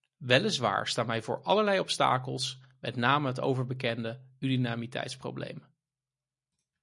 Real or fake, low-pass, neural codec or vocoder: real; 10.8 kHz; none